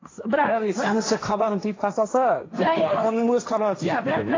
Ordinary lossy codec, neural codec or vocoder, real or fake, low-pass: AAC, 32 kbps; codec, 16 kHz, 1.1 kbps, Voila-Tokenizer; fake; 7.2 kHz